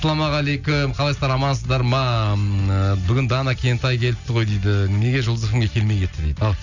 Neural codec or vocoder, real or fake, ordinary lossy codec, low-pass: none; real; none; 7.2 kHz